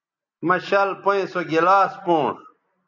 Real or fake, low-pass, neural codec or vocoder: real; 7.2 kHz; none